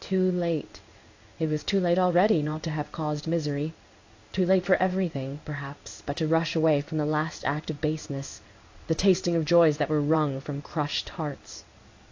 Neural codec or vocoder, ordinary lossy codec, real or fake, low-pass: codec, 16 kHz in and 24 kHz out, 1 kbps, XY-Tokenizer; AAC, 48 kbps; fake; 7.2 kHz